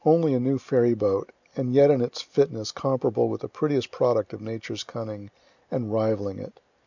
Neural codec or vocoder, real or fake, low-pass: none; real; 7.2 kHz